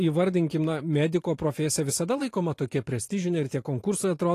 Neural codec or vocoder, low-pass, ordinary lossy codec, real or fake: vocoder, 44.1 kHz, 128 mel bands every 512 samples, BigVGAN v2; 14.4 kHz; AAC, 48 kbps; fake